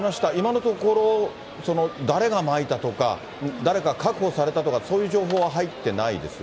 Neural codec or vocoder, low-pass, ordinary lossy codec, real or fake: none; none; none; real